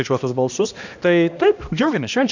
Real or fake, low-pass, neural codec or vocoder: fake; 7.2 kHz; codec, 16 kHz, 1 kbps, X-Codec, HuBERT features, trained on balanced general audio